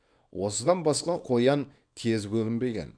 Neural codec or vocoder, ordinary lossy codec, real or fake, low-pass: codec, 24 kHz, 0.9 kbps, WavTokenizer, small release; none; fake; 9.9 kHz